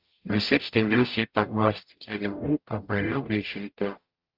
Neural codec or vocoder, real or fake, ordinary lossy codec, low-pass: codec, 44.1 kHz, 0.9 kbps, DAC; fake; Opus, 24 kbps; 5.4 kHz